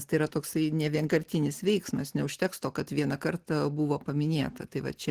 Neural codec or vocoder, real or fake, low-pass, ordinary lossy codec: none; real; 14.4 kHz; Opus, 16 kbps